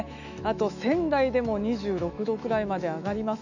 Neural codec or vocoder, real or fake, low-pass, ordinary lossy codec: autoencoder, 48 kHz, 128 numbers a frame, DAC-VAE, trained on Japanese speech; fake; 7.2 kHz; none